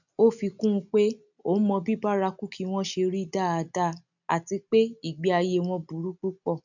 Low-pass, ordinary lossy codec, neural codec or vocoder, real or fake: 7.2 kHz; none; none; real